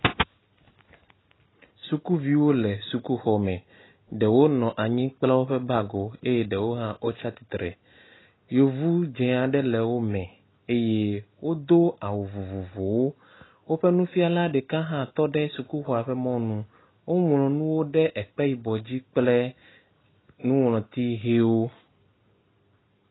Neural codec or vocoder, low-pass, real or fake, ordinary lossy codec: none; 7.2 kHz; real; AAC, 16 kbps